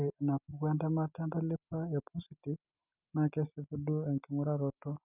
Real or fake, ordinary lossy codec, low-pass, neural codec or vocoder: real; none; 3.6 kHz; none